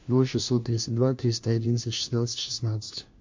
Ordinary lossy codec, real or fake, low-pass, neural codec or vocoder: MP3, 48 kbps; fake; 7.2 kHz; codec, 16 kHz, 1 kbps, FunCodec, trained on LibriTTS, 50 frames a second